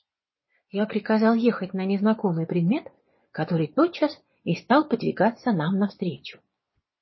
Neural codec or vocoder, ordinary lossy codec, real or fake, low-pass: vocoder, 24 kHz, 100 mel bands, Vocos; MP3, 24 kbps; fake; 7.2 kHz